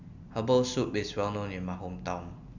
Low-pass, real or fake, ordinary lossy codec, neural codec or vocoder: 7.2 kHz; real; none; none